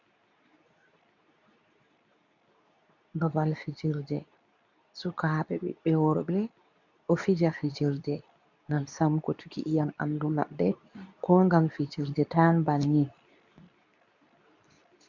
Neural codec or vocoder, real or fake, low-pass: codec, 24 kHz, 0.9 kbps, WavTokenizer, medium speech release version 2; fake; 7.2 kHz